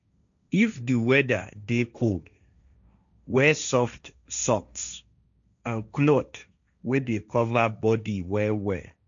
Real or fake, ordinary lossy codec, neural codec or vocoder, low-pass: fake; none; codec, 16 kHz, 1.1 kbps, Voila-Tokenizer; 7.2 kHz